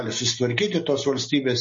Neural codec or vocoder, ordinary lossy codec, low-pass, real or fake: none; MP3, 32 kbps; 7.2 kHz; real